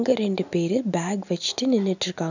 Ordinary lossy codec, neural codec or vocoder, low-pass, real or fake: none; none; 7.2 kHz; real